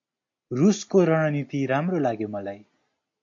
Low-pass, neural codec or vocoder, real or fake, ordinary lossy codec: 7.2 kHz; none; real; AAC, 48 kbps